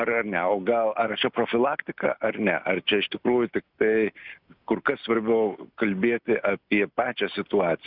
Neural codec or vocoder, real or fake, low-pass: none; real; 5.4 kHz